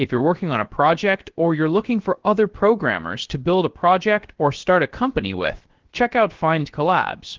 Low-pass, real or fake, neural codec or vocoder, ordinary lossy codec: 7.2 kHz; fake; codec, 16 kHz, 0.7 kbps, FocalCodec; Opus, 16 kbps